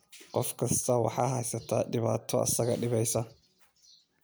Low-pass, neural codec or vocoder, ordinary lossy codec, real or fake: none; none; none; real